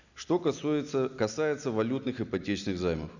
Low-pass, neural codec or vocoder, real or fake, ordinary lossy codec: 7.2 kHz; none; real; none